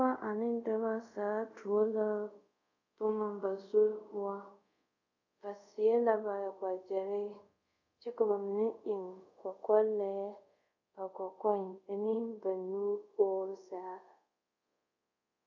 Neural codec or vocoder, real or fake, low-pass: codec, 24 kHz, 0.5 kbps, DualCodec; fake; 7.2 kHz